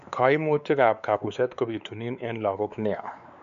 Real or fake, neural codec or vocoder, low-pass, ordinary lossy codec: fake; codec, 16 kHz, 4 kbps, X-Codec, WavLM features, trained on Multilingual LibriSpeech; 7.2 kHz; none